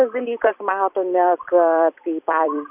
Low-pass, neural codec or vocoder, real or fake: 3.6 kHz; vocoder, 44.1 kHz, 128 mel bands every 256 samples, BigVGAN v2; fake